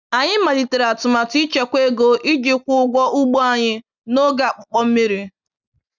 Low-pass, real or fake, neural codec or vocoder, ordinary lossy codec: 7.2 kHz; real; none; none